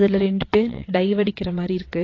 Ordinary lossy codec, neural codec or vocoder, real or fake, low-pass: AAC, 32 kbps; vocoder, 22.05 kHz, 80 mel bands, WaveNeXt; fake; 7.2 kHz